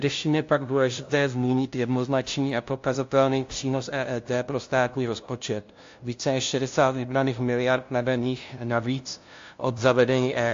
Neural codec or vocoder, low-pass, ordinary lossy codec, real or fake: codec, 16 kHz, 0.5 kbps, FunCodec, trained on LibriTTS, 25 frames a second; 7.2 kHz; AAC, 48 kbps; fake